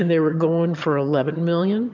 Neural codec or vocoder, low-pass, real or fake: vocoder, 22.05 kHz, 80 mel bands, HiFi-GAN; 7.2 kHz; fake